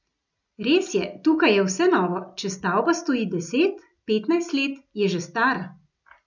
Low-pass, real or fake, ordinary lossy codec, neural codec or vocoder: 7.2 kHz; real; none; none